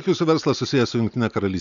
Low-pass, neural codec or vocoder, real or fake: 7.2 kHz; none; real